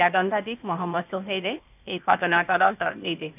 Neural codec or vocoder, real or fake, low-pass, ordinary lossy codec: codec, 16 kHz, 0.8 kbps, ZipCodec; fake; 3.6 kHz; none